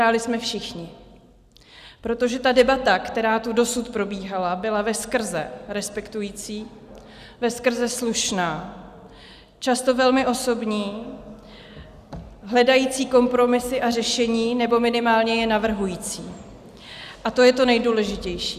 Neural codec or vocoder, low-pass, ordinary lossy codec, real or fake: none; 14.4 kHz; Opus, 64 kbps; real